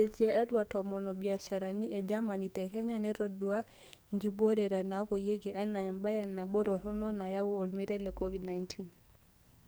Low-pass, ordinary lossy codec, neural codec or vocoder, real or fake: none; none; codec, 44.1 kHz, 2.6 kbps, SNAC; fake